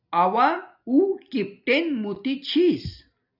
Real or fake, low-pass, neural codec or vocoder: real; 5.4 kHz; none